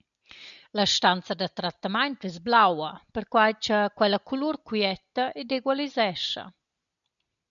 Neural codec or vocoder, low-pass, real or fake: none; 7.2 kHz; real